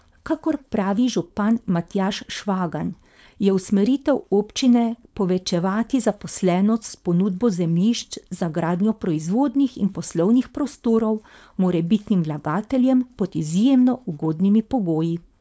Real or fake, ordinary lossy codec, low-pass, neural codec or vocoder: fake; none; none; codec, 16 kHz, 4.8 kbps, FACodec